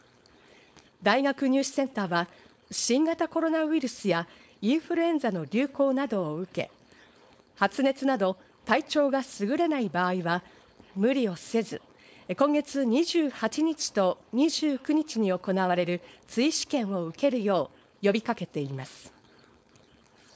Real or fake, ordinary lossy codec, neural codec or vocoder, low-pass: fake; none; codec, 16 kHz, 4.8 kbps, FACodec; none